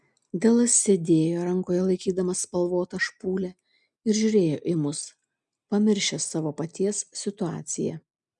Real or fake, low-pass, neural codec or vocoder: real; 10.8 kHz; none